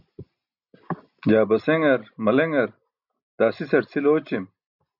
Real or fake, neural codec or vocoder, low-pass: real; none; 5.4 kHz